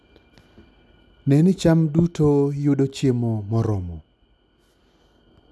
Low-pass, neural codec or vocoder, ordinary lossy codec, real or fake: none; none; none; real